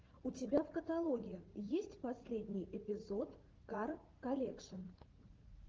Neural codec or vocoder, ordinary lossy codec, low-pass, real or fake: vocoder, 44.1 kHz, 80 mel bands, Vocos; Opus, 16 kbps; 7.2 kHz; fake